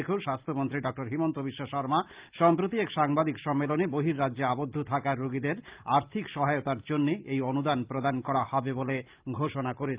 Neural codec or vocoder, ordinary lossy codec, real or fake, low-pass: none; Opus, 32 kbps; real; 3.6 kHz